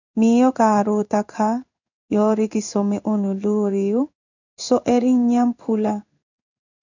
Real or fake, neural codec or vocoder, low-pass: fake; codec, 16 kHz in and 24 kHz out, 1 kbps, XY-Tokenizer; 7.2 kHz